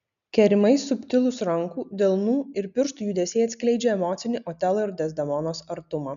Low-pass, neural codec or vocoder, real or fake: 7.2 kHz; none; real